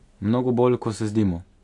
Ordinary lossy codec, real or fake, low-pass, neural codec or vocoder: AAC, 64 kbps; real; 10.8 kHz; none